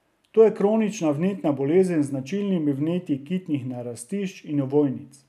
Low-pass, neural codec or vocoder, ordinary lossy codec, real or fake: 14.4 kHz; none; none; real